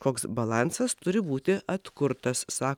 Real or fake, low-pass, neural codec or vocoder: real; 19.8 kHz; none